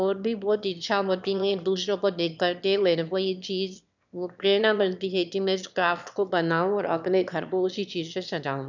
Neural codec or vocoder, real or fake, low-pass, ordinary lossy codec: autoencoder, 22.05 kHz, a latent of 192 numbers a frame, VITS, trained on one speaker; fake; 7.2 kHz; none